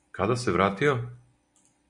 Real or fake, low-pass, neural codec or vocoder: real; 10.8 kHz; none